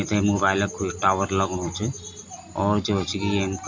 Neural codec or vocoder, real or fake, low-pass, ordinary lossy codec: none; real; 7.2 kHz; MP3, 64 kbps